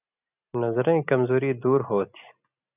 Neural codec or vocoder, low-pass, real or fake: none; 3.6 kHz; real